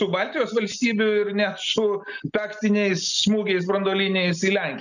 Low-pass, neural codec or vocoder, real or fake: 7.2 kHz; none; real